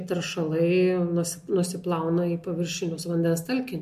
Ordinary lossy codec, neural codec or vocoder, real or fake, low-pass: MP3, 64 kbps; none; real; 14.4 kHz